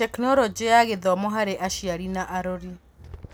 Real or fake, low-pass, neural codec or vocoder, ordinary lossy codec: real; none; none; none